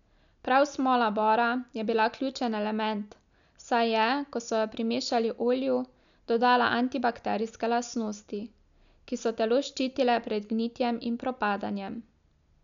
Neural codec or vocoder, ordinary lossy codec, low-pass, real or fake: none; none; 7.2 kHz; real